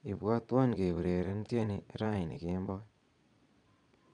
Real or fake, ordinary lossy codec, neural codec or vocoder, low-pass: fake; MP3, 96 kbps; vocoder, 22.05 kHz, 80 mel bands, Vocos; 9.9 kHz